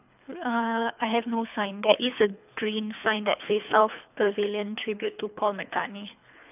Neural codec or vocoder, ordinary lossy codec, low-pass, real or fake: codec, 24 kHz, 3 kbps, HILCodec; none; 3.6 kHz; fake